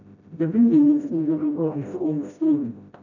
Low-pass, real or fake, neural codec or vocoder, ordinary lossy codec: 7.2 kHz; fake; codec, 16 kHz, 0.5 kbps, FreqCodec, smaller model; none